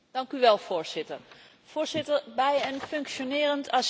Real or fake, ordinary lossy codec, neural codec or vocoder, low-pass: real; none; none; none